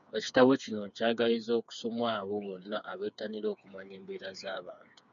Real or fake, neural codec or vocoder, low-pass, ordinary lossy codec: fake; codec, 16 kHz, 4 kbps, FreqCodec, smaller model; 7.2 kHz; MP3, 96 kbps